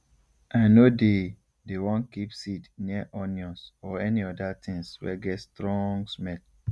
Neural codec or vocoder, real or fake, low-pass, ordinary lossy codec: none; real; none; none